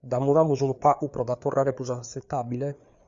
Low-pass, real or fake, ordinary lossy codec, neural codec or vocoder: 7.2 kHz; fake; Opus, 64 kbps; codec, 16 kHz, 4 kbps, FreqCodec, larger model